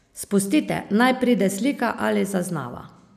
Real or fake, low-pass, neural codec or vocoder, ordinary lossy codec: real; 14.4 kHz; none; none